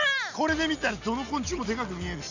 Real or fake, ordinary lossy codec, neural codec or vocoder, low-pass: real; none; none; 7.2 kHz